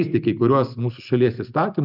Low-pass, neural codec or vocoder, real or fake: 5.4 kHz; none; real